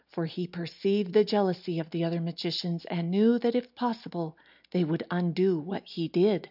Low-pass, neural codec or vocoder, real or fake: 5.4 kHz; none; real